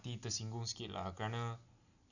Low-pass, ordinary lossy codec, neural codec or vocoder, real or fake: 7.2 kHz; none; none; real